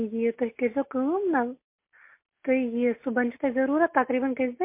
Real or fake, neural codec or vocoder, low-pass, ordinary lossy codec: real; none; 3.6 kHz; MP3, 24 kbps